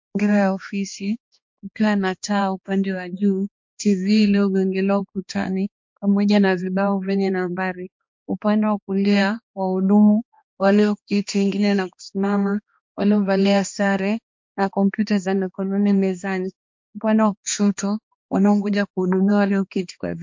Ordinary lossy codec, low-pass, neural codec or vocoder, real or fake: MP3, 48 kbps; 7.2 kHz; codec, 16 kHz, 2 kbps, X-Codec, HuBERT features, trained on balanced general audio; fake